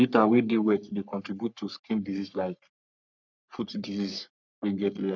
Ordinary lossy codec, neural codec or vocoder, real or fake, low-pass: none; codec, 44.1 kHz, 3.4 kbps, Pupu-Codec; fake; 7.2 kHz